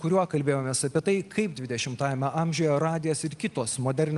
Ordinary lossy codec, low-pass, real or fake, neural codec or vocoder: Opus, 32 kbps; 10.8 kHz; real; none